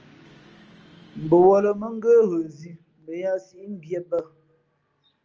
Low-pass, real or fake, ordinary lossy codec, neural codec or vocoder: 7.2 kHz; real; Opus, 24 kbps; none